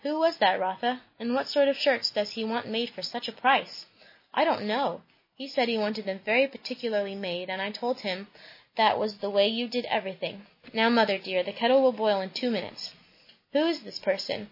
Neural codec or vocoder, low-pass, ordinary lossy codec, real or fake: none; 5.4 kHz; MP3, 24 kbps; real